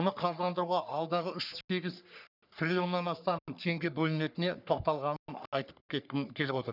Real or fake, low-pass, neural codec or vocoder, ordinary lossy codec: fake; 5.4 kHz; codec, 44.1 kHz, 3.4 kbps, Pupu-Codec; none